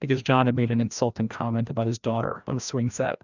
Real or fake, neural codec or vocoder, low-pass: fake; codec, 16 kHz, 1 kbps, FreqCodec, larger model; 7.2 kHz